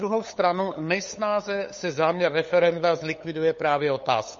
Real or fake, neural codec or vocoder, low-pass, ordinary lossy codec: fake; codec, 16 kHz, 8 kbps, FunCodec, trained on LibriTTS, 25 frames a second; 7.2 kHz; MP3, 32 kbps